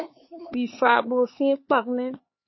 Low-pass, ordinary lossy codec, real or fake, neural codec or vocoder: 7.2 kHz; MP3, 24 kbps; fake; codec, 16 kHz, 4 kbps, X-Codec, HuBERT features, trained on LibriSpeech